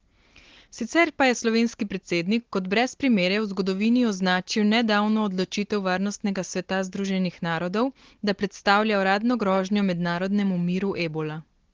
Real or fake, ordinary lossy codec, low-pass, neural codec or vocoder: real; Opus, 16 kbps; 7.2 kHz; none